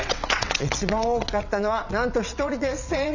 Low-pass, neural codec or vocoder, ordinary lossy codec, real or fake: 7.2 kHz; vocoder, 22.05 kHz, 80 mel bands, WaveNeXt; none; fake